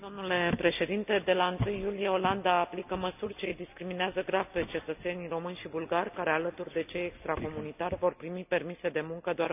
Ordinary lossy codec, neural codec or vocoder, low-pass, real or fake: none; none; 3.6 kHz; real